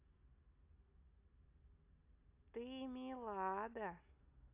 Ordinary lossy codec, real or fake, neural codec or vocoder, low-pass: AAC, 32 kbps; real; none; 3.6 kHz